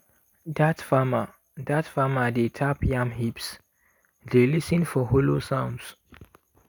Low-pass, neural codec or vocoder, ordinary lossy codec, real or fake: none; none; none; real